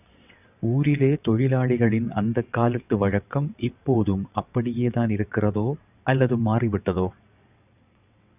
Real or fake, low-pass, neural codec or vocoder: fake; 3.6 kHz; vocoder, 22.05 kHz, 80 mel bands, WaveNeXt